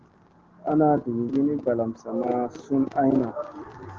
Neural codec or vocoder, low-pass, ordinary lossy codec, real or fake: none; 7.2 kHz; Opus, 16 kbps; real